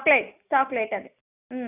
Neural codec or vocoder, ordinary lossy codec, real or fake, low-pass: none; none; real; 3.6 kHz